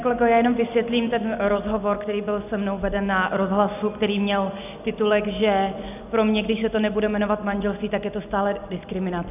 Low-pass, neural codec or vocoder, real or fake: 3.6 kHz; none; real